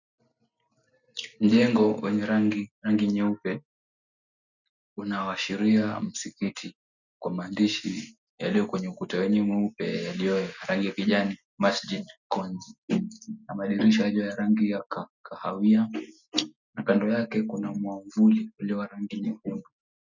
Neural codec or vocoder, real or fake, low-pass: none; real; 7.2 kHz